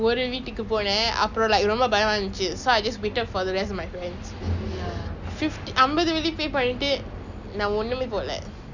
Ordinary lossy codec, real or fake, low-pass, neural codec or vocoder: none; real; 7.2 kHz; none